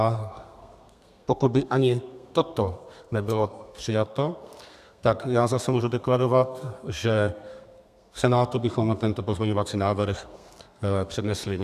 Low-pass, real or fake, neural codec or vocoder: 14.4 kHz; fake; codec, 32 kHz, 1.9 kbps, SNAC